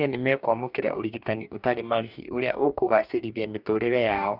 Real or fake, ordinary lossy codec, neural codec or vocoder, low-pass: fake; none; codec, 44.1 kHz, 2.6 kbps, DAC; 5.4 kHz